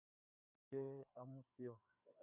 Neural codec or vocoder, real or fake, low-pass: codec, 16 kHz, 2 kbps, FreqCodec, larger model; fake; 3.6 kHz